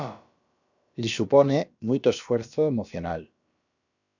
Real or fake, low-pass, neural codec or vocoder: fake; 7.2 kHz; codec, 16 kHz, about 1 kbps, DyCAST, with the encoder's durations